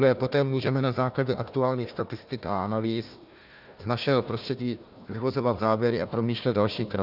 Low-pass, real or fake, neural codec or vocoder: 5.4 kHz; fake; codec, 16 kHz, 1 kbps, FunCodec, trained on Chinese and English, 50 frames a second